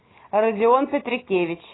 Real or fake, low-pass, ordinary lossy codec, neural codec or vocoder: real; 7.2 kHz; AAC, 16 kbps; none